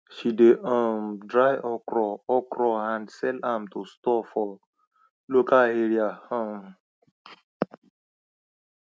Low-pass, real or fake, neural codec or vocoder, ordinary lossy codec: none; real; none; none